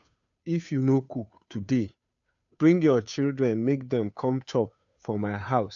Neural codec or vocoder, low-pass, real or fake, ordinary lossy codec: codec, 16 kHz, 2 kbps, FunCodec, trained on Chinese and English, 25 frames a second; 7.2 kHz; fake; none